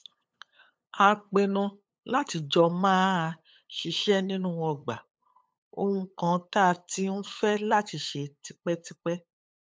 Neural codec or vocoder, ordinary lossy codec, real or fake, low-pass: codec, 16 kHz, 8 kbps, FunCodec, trained on LibriTTS, 25 frames a second; none; fake; none